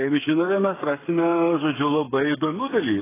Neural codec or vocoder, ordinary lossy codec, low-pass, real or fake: codec, 16 kHz, 8 kbps, FreqCodec, smaller model; AAC, 16 kbps; 3.6 kHz; fake